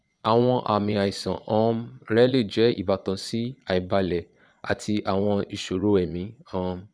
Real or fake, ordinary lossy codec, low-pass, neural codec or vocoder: fake; none; none; vocoder, 22.05 kHz, 80 mel bands, Vocos